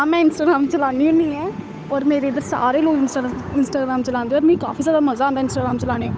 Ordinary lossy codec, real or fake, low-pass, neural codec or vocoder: none; fake; none; codec, 16 kHz, 8 kbps, FunCodec, trained on Chinese and English, 25 frames a second